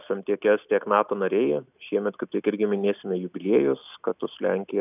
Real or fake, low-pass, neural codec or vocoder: real; 3.6 kHz; none